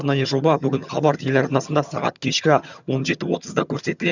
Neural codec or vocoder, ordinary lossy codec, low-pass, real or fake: vocoder, 22.05 kHz, 80 mel bands, HiFi-GAN; none; 7.2 kHz; fake